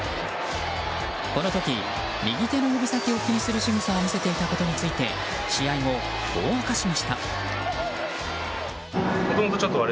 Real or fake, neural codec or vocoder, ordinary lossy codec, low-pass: real; none; none; none